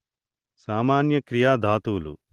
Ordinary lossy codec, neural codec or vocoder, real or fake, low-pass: Opus, 16 kbps; none; real; 14.4 kHz